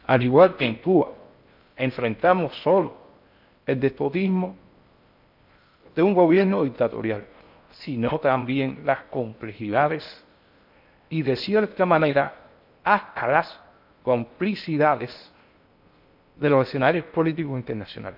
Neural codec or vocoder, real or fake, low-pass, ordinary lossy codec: codec, 16 kHz in and 24 kHz out, 0.6 kbps, FocalCodec, streaming, 4096 codes; fake; 5.4 kHz; none